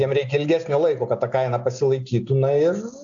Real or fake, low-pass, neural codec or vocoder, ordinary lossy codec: real; 7.2 kHz; none; AAC, 64 kbps